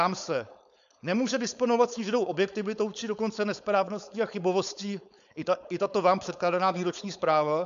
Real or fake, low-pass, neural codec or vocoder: fake; 7.2 kHz; codec, 16 kHz, 4.8 kbps, FACodec